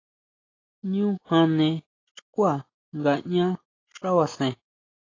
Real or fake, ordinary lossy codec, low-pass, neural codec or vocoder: real; AAC, 32 kbps; 7.2 kHz; none